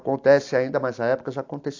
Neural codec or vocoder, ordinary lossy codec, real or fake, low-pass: none; AAC, 48 kbps; real; 7.2 kHz